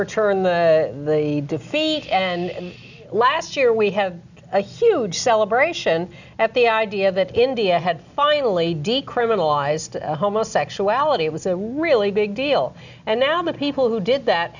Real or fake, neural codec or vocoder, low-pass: real; none; 7.2 kHz